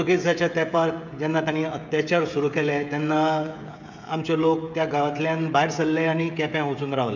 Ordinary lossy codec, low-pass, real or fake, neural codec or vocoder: none; 7.2 kHz; fake; codec, 16 kHz, 16 kbps, FreqCodec, smaller model